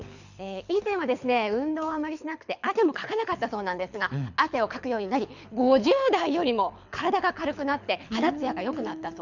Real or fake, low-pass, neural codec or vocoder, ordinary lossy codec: fake; 7.2 kHz; codec, 24 kHz, 6 kbps, HILCodec; none